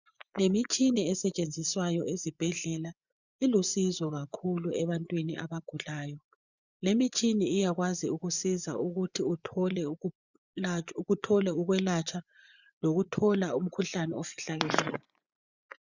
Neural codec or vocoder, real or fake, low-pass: vocoder, 24 kHz, 100 mel bands, Vocos; fake; 7.2 kHz